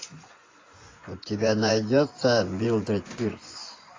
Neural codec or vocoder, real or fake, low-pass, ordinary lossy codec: vocoder, 24 kHz, 100 mel bands, Vocos; fake; 7.2 kHz; AAC, 32 kbps